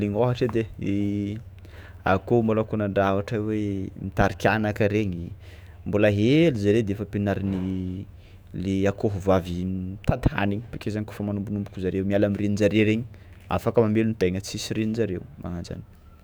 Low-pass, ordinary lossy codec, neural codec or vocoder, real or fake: none; none; autoencoder, 48 kHz, 128 numbers a frame, DAC-VAE, trained on Japanese speech; fake